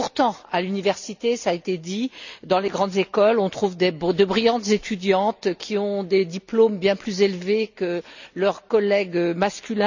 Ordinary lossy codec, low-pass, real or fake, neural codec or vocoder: none; 7.2 kHz; real; none